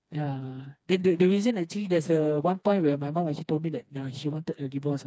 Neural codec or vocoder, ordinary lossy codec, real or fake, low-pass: codec, 16 kHz, 2 kbps, FreqCodec, smaller model; none; fake; none